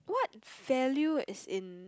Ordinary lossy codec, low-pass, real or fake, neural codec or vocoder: none; none; real; none